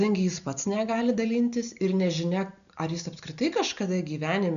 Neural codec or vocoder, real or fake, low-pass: none; real; 7.2 kHz